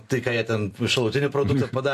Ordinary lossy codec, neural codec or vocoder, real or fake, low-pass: AAC, 64 kbps; none; real; 14.4 kHz